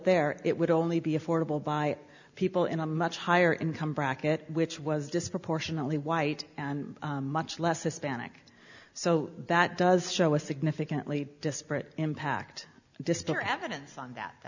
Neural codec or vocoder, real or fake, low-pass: none; real; 7.2 kHz